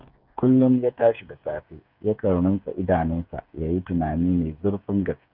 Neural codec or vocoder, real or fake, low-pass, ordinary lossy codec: codec, 16 kHz, 8 kbps, FreqCodec, smaller model; fake; 5.4 kHz; none